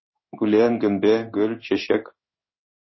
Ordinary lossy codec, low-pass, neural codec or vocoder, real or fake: MP3, 24 kbps; 7.2 kHz; codec, 16 kHz in and 24 kHz out, 1 kbps, XY-Tokenizer; fake